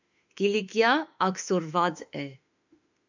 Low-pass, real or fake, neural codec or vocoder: 7.2 kHz; fake; autoencoder, 48 kHz, 32 numbers a frame, DAC-VAE, trained on Japanese speech